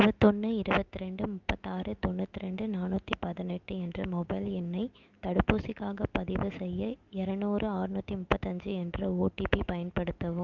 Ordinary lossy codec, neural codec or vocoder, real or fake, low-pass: Opus, 24 kbps; autoencoder, 48 kHz, 128 numbers a frame, DAC-VAE, trained on Japanese speech; fake; 7.2 kHz